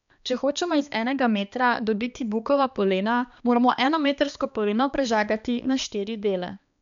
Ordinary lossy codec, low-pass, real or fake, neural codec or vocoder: none; 7.2 kHz; fake; codec, 16 kHz, 2 kbps, X-Codec, HuBERT features, trained on balanced general audio